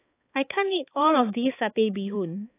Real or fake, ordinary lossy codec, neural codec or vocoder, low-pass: fake; AAC, 24 kbps; codec, 16 kHz, 4 kbps, X-Codec, HuBERT features, trained on balanced general audio; 3.6 kHz